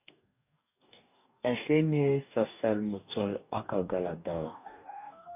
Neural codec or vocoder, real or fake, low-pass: codec, 44.1 kHz, 2.6 kbps, DAC; fake; 3.6 kHz